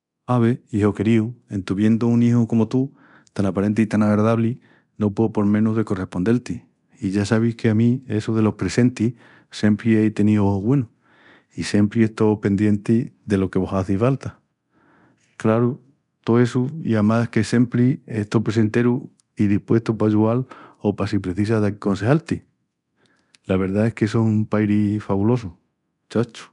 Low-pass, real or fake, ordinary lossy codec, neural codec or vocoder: 10.8 kHz; fake; none; codec, 24 kHz, 0.9 kbps, DualCodec